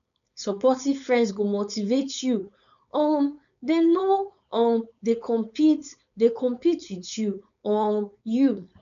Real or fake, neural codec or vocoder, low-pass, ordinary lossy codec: fake; codec, 16 kHz, 4.8 kbps, FACodec; 7.2 kHz; none